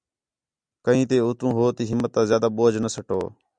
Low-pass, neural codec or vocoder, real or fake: 9.9 kHz; none; real